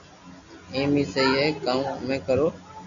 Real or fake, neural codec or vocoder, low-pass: real; none; 7.2 kHz